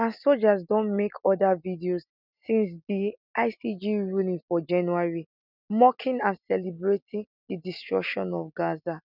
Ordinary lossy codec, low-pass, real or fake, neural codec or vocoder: none; 5.4 kHz; real; none